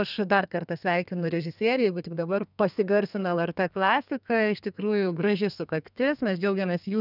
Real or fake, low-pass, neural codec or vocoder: fake; 5.4 kHz; codec, 32 kHz, 1.9 kbps, SNAC